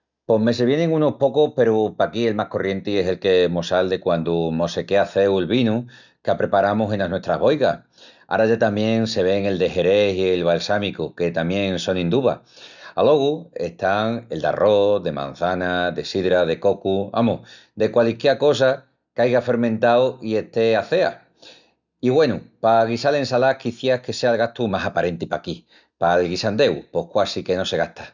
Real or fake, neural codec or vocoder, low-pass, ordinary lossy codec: real; none; 7.2 kHz; none